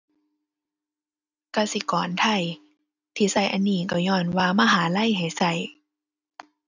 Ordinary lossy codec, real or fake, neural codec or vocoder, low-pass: none; real; none; 7.2 kHz